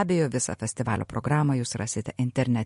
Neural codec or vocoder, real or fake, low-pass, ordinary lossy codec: none; real; 14.4 kHz; MP3, 48 kbps